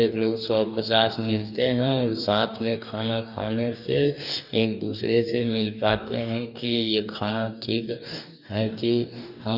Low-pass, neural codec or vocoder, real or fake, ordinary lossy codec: 5.4 kHz; codec, 44.1 kHz, 2.6 kbps, DAC; fake; none